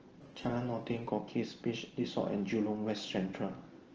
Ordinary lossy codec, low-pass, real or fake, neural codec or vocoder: Opus, 16 kbps; 7.2 kHz; real; none